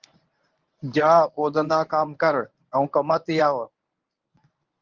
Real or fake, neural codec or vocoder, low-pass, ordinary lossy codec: fake; codec, 24 kHz, 0.9 kbps, WavTokenizer, medium speech release version 1; 7.2 kHz; Opus, 32 kbps